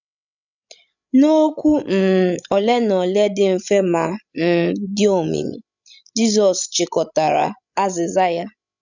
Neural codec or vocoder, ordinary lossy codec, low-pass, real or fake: codec, 16 kHz, 16 kbps, FreqCodec, larger model; none; 7.2 kHz; fake